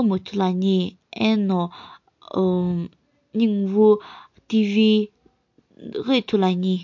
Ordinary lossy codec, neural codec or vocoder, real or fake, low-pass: MP3, 48 kbps; none; real; 7.2 kHz